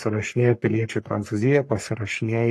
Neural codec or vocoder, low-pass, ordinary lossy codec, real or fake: codec, 44.1 kHz, 3.4 kbps, Pupu-Codec; 14.4 kHz; AAC, 64 kbps; fake